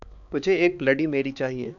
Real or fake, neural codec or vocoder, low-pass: fake; codec, 16 kHz, 4 kbps, X-Codec, HuBERT features, trained on balanced general audio; 7.2 kHz